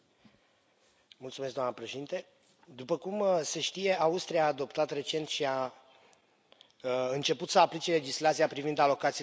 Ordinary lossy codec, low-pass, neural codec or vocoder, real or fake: none; none; none; real